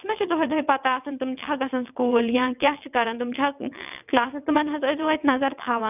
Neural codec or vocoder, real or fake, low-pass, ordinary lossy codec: vocoder, 22.05 kHz, 80 mel bands, WaveNeXt; fake; 3.6 kHz; none